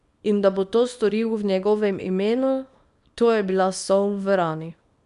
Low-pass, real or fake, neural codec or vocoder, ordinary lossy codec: 10.8 kHz; fake; codec, 24 kHz, 0.9 kbps, WavTokenizer, small release; none